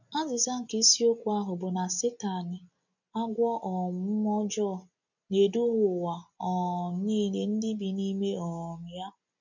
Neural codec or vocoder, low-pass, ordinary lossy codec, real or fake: none; 7.2 kHz; none; real